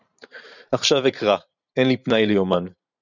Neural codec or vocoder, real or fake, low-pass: vocoder, 44.1 kHz, 128 mel bands every 512 samples, BigVGAN v2; fake; 7.2 kHz